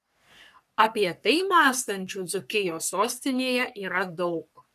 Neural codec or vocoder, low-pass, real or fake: codec, 44.1 kHz, 3.4 kbps, Pupu-Codec; 14.4 kHz; fake